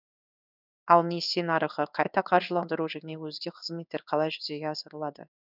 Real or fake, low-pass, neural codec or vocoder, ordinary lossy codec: fake; 5.4 kHz; codec, 16 kHz in and 24 kHz out, 1 kbps, XY-Tokenizer; none